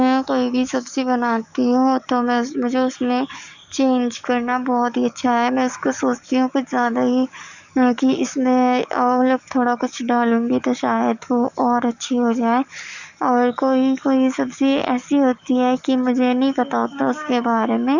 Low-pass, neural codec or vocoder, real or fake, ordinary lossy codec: 7.2 kHz; codec, 44.1 kHz, 7.8 kbps, DAC; fake; none